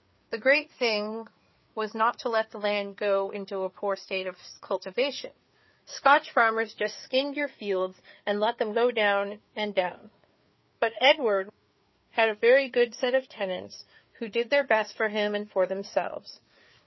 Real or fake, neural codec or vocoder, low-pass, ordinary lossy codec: fake; codec, 16 kHz, 4 kbps, FreqCodec, larger model; 7.2 kHz; MP3, 24 kbps